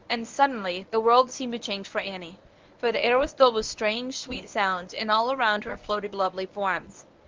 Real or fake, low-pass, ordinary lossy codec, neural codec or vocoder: fake; 7.2 kHz; Opus, 24 kbps; codec, 24 kHz, 0.9 kbps, WavTokenizer, medium speech release version 1